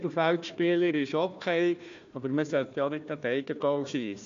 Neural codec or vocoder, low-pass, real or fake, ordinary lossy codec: codec, 16 kHz, 1 kbps, FunCodec, trained on Chinese and English, 50 frames a second; 7.2 kHz; fake; MP3, 64 kbps